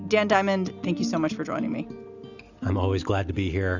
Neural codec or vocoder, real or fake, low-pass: none; real; 7.2 kHz